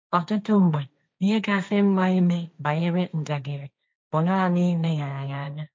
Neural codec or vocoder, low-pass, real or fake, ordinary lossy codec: codec, 16 kHz, 1.1 kbps, Voila-Tokenizer; none; fake; none